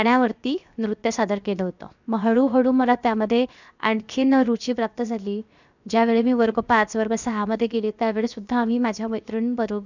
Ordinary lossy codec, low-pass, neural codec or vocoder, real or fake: none; 7.2 kHz; codec, 16 kHz, 0.7 kbps, FocalCodec; fake